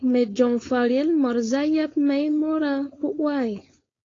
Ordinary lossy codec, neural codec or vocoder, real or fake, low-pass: AAC, 32 kbps; codec, 16 kHz, 4.8 kbps, FACodec; fake; 7.2 kHz